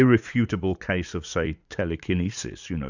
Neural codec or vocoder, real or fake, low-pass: vocoder, 44.1 kHz, 80 mel bands, Vocos; fake; 7.2 kHz